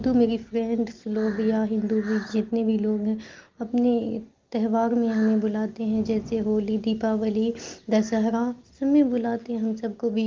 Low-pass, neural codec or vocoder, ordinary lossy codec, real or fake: 7.2 kHz; none; Opus, 32 kbps; real